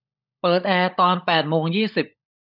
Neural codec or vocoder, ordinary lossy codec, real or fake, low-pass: codec, 16 kHz, 16 kbps, FunCodec, trained on LibriTTS, 50 frames a second; none; fake; 5.4 kHz